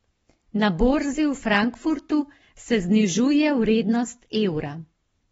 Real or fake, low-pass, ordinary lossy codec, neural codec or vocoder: fake; 19.8 kHz; AAC, 24 kbps; codec, 44.1 kHz, 7.8 kbps, Pupu-Codec